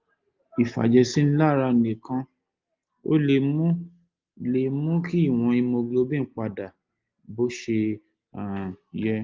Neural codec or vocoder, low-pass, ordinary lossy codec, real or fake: none; 7.2 kHz; Opus, 24 kbps; real